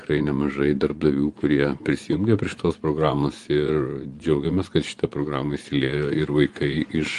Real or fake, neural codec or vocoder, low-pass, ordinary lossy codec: fake; vocoder, 24 kHz, 100 mel bands, Vocos; 10.8 kHz; Opus, 32 kbps